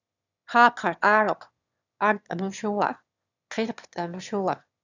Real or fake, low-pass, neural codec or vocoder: fake; 7.2 kHz; autoencoder, 22.05 kHz, a latent of 192 numbers a frame, VITS, trained on one speaker